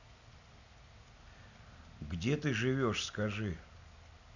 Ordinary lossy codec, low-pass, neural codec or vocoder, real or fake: none; 7.2 kHz; none; real